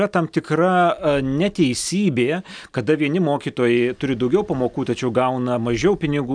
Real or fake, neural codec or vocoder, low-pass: real; none; 9.9 kHz